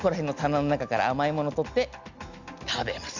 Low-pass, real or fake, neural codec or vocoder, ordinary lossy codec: 7.2 kHz; real; none; none